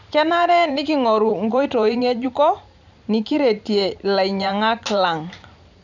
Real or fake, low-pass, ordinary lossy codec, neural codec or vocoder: fake; 7.2 kHz; none; vocoder, 44.1 kHz, 128 mel bands every 512 samples, BigVGAN v2